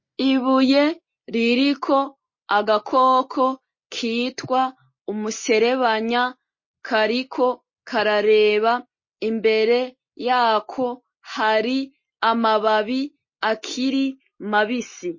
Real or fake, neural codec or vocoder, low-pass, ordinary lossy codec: real; none; 7.2 kHz; MP3, 32 kbps